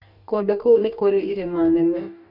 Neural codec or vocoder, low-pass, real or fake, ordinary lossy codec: codec, 44.1 kHz, 2.6 kbps, DAC; 5.4 kHz; fake; none